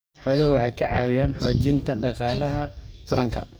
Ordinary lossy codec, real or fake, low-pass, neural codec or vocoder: none; fake; none; codec, 44.1 kHz, 2.6 kbps, DAC